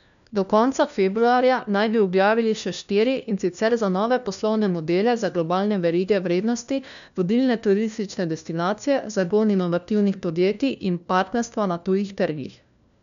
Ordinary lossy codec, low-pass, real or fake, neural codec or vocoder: none; 7.2 kHz; fake; codec, 16 kHz, 1 kbps, FunCodec, trained on LibriTTS, 50 frames a second